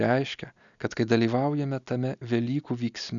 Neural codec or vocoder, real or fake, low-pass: none; real; 7.2 kHz